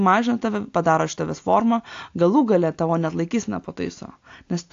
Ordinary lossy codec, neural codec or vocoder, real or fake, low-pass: AAC, 48 kbps; none; real; 7.2 kHz